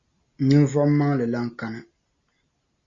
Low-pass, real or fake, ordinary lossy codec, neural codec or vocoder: 7.2 kHz; real; Opus, 64 kbps; none